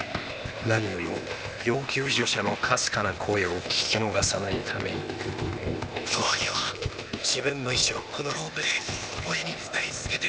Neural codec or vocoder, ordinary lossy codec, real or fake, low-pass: codec, 16 kHz, 0.8 kbps, ZipCodec; none; fake; none